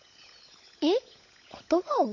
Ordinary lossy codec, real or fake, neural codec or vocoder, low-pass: MP3, 48 kbps; fake; codec, 16 kHz, 16 kbps, FunCodec, trained on LibriTTS, 50 frames a second; 7.2 kHz